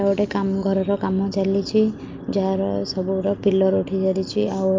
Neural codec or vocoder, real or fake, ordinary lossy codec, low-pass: none; real; Opus, 24 kbps; 7.2 kHz